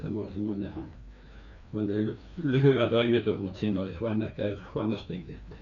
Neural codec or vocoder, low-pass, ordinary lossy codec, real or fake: codec, 16 kHz, 2 kbps, FreqCodec, larger model; 7.2 kHz; none; fake